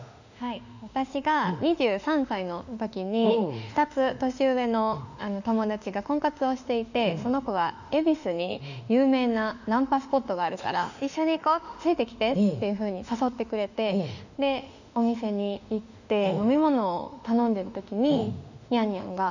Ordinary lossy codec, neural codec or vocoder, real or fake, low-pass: none; autoencoder, 48 kHz, 32 numbers a frame, DAC-VAE, trained on Japanese speech; fake; 7.2 kHz